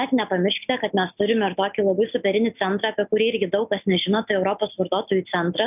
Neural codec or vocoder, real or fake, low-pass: none; real; 3.6 kHz